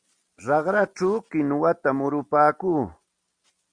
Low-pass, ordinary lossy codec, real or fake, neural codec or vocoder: 9.9 kHz; Opus, 64 kbps; real; none